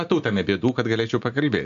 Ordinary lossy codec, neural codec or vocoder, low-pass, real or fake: MP3, 96 kbps; none; 7.2 kHz; real